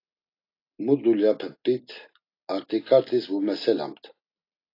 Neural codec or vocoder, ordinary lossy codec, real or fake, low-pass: none; AAC, 32 kbps; real; 5.4 kHz